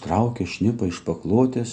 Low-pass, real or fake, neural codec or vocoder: 9.9 kHz; real; none